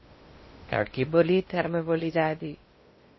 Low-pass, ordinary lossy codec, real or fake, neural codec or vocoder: 7.2 kHz; MP3, 24 kbps; fake; codec, 16 kHz in and 24 kHz out, 0.6 kbps, FocalCodec, streaming, 2048 codes